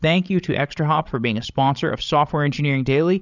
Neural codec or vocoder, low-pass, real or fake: codec, 16 kHz, 16 kbps, FreqCodec, larger model; 7.2 kHz; fake